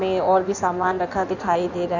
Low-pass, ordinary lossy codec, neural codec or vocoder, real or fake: 7.2 kHz; none; codec, 16 kHz in and 24 kHz out, 1.1 kbps, FireRedTTS-2 codec; fake